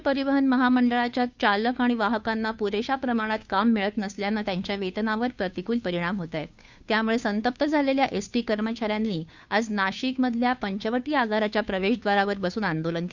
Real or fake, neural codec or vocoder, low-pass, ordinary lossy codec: fake; codec, 16 kHz, 2 kbps, FunCodec, trained on Chinese and English, 25 frames a second; 7.2 kHz; Opus, 64 kbps